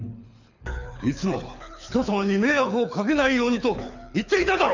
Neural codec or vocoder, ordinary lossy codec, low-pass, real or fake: codec, 24 kHz, 6 kbps, HILCodec; none; 7.2 kHz; fake